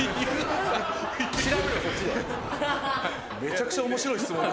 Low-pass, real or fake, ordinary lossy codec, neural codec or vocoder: none; real; none; none